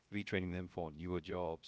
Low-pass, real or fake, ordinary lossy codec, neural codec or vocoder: none; fake; none; codec, 16 kHz, 0.7 kbps, FocalCodec